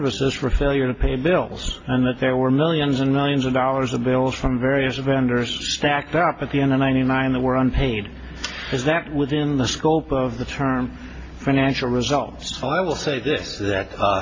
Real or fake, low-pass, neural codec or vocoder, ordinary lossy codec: real; 7.2 kHz; none; AAC, 32 kbps